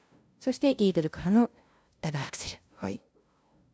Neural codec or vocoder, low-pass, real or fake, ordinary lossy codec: codec, 16 kHz, 0.5 kbps, FunCodec, trained on LibriTTS, 25 frames a second; none; fake; none